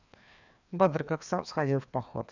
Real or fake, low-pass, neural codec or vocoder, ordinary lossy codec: fake; 7.2 kHz; codec, 16 kHz, 2 kbps, FreqCodec, larger model; none